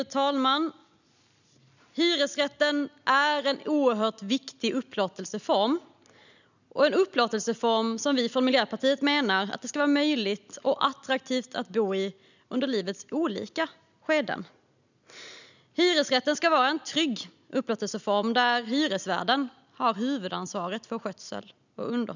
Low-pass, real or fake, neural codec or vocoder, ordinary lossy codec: 7.2 kHz; real; none; none